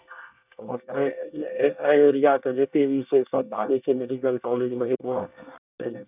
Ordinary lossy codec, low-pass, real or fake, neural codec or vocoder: none; 3.6 kHz; fake; codec, 24 kHz, 1 kbps, SNAC